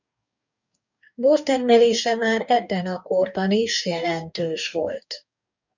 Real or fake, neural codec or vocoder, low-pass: fake; codec, 44.1 kHz, 2.6 kbps, DAC; 7.2 kHz